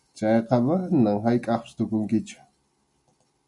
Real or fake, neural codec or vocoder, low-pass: real; none; 10.8 kHz